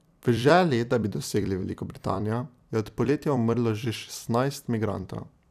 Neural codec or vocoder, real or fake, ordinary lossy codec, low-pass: vocoder, 44.1 kHz, 128 mel bands every 256 samples, BigVGAN v2; fake; none; 14.4 kHz